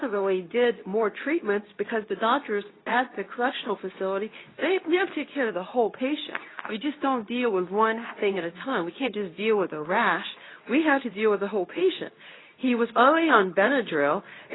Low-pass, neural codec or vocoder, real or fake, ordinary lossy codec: 7.2 kHz; codec, 24 kHz, 0.9 kbps, WavTokenizer, medium speech release version 2; fake; AAC, 16 kbps